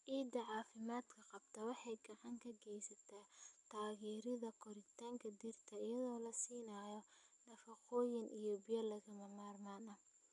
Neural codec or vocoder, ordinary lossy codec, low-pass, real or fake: none; none; 10.8 kHz; real